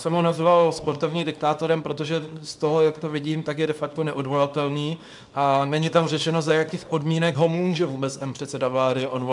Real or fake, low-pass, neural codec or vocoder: fake; 10.8 kHz; codec, 24 kHz, 0.9 kbps, WavTokenizer, small release